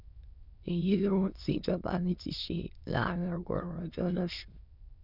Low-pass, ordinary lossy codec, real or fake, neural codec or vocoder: 5.4 kHz; none; fake; autoencoder, 22.05 kHz, a latent of 192 numbers a frame, VITS, trained on many speakers